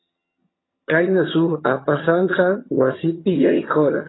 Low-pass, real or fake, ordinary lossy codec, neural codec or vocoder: 7.2 kHz; fake; AAC, 16 kbps; vocoder, 22.05 kHz, 80 mel bands, HiFi-GAN